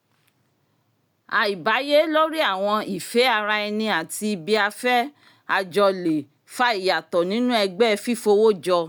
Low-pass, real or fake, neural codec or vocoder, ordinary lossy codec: none; real; none; none